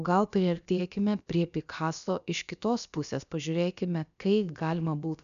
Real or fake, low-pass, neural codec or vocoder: fake; 7.2 kHz; codec, 16 kHz, about 1 kbps, DyCAST, with the encoder's durations